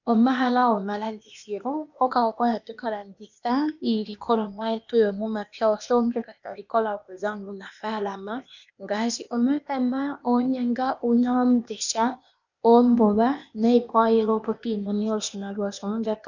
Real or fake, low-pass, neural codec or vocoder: fake; 7.2 kHz; codec, 16 kHz, 0.8 kbps, ZipCodec